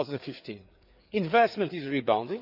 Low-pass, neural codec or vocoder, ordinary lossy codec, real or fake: 5.4 kHz; codec, 16 kHz, 4 kbps, FreqCodec, larger model; none; fake